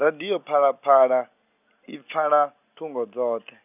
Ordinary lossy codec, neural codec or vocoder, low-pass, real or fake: none; none; 3.6 kHz; real